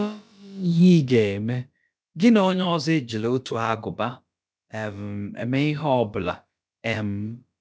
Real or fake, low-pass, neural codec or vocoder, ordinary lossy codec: fake; none; codec, 16 kHz, about 1 kbps, DyCAST, with the encoder's durations; none